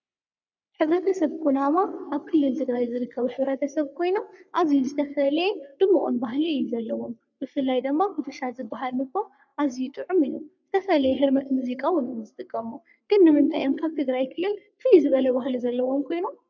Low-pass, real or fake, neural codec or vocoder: 7.2 kHz; fake; codec, 44.1 kHz, 3.4 kbps, Pupu-Codec